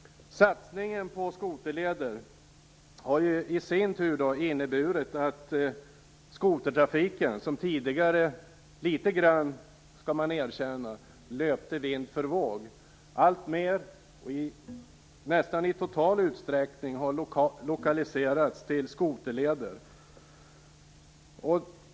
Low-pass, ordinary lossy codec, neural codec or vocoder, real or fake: none; none; none; real